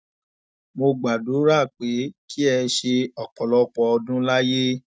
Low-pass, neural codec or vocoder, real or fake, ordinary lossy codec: none; none; real; none